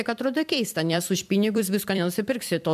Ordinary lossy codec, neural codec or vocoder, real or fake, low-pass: MP3, 96 kbps; vocoder, 44.1 kHz, 128 mel bands every 256 samples, BigVGAN v2; fake; 14.4 kHz